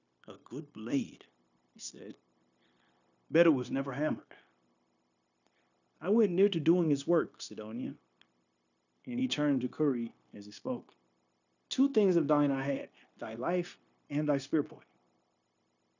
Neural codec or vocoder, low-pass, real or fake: codec, 16 kHz, 0.9 kbps, LongCat-Audio-Codec; 7.2 kHz; fake